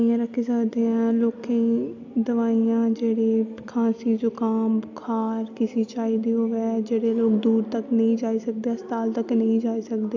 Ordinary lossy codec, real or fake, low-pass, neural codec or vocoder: AAC, 48 kbps; real; 7.2 kHz; none